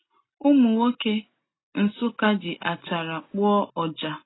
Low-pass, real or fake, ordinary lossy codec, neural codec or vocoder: 7.2 kHz; real; AAC, 16 kbps; none